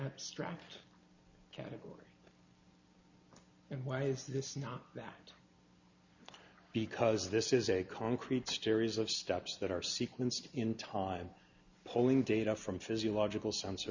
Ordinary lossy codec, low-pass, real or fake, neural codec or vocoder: Opus, 64 kbps; 7.2 kHz; real; none